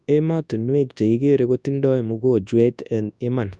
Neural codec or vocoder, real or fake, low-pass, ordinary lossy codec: codec, 24 kHz, 0.9 kbps, WavTokenizer, large speech release; fake; 10.8 kHz; none